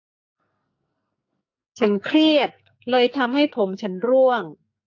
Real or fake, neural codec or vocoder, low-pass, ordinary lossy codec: fake; codec, 44.1 kHz, 2.6 kbps, SNAC; 7.2 kHz; AAC, 48 kbps